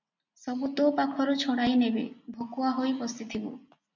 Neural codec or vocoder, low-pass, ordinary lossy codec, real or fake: none; 7.2 kHz; MP3, 64 kbps; real